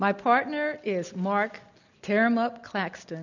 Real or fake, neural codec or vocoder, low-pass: real; none; 7.2 kHz